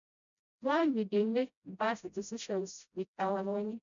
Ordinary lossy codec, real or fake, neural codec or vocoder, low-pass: none; fake; codec, 16 kHz, 0.5 kbps, FreqCodec, smaller model; 7.2 kHz